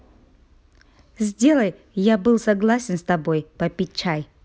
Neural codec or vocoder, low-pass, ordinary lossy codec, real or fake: none; none; none; real